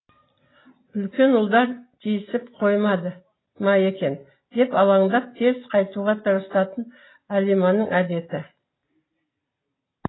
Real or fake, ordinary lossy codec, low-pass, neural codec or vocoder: real; AAC, 16 kbps; 7.2 kHz; none